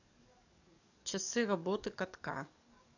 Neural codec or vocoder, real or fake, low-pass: codec, 44.1 kHz, 7.8 kbps, DAC; fake; 7.2 kHz